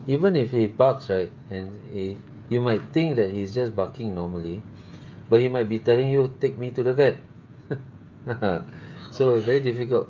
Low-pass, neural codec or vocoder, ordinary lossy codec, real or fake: 7.2 kHz; codec, 16 kHz, 16 kbps, FreqCodec, smaller model; Opus, 24 kbps; fake